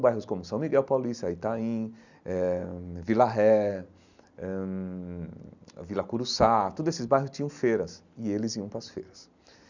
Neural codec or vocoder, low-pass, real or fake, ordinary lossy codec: none; 7.2 kHz; real; none